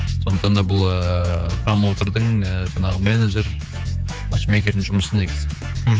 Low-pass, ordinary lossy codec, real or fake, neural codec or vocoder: none; none; fake; codec, 16 kHz, 4 kbps, X-Codec, HuBERT features, trained on general audio